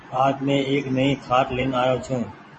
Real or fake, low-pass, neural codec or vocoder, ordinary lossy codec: fake; 10.8 kHz; vocoder, 24 kHz, 100 mel bands, Vocos; MP3, 32 kbps